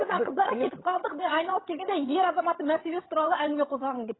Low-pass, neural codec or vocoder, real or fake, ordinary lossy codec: 7.2 kHz; vocoder, 22.05 kHz, 80 mel bands, HiFi-GAN; fake; AAC, 16 kbps